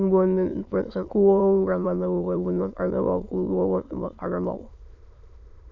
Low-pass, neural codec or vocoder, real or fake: 7.2 kHz; autoencoder, 22.05 kHz, a latent of 192 numbers a frame, VITS, trained on many speakers; fake